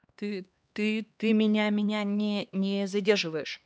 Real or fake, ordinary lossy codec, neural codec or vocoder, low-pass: fake; none; codec, 16 kHz, 2 kbps, X-Codec, HuBERT features, trained on LibriSpeech; none